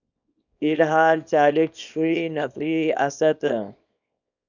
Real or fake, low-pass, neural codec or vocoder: fake; 7.2 kHz; codec, 24 kHz, 0.9 kbps, WavTokenizer, small release